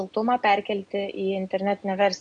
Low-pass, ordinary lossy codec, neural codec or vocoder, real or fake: 9.9 kHz; AAC, 64 kbps; none; real